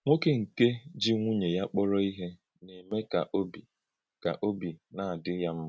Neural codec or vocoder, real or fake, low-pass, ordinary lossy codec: none; real; none; none